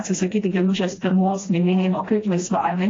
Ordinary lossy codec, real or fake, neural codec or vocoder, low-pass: AAC, 32 kbps; fake; codec, 16 kHz, 1 kbps, FreqCodec, smaller model; 7.2 kHz